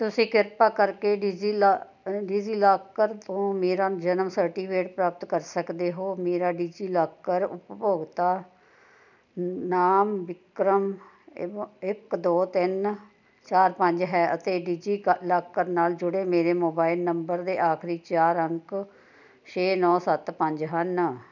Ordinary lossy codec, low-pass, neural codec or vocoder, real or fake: none; 7.2 kHz; none; real